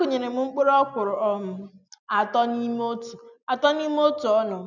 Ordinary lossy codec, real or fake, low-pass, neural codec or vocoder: none; real; 7.2 kHz; none